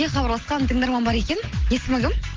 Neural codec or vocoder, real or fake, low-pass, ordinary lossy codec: none; real; 7.2 kHz; Opus, 16 kbps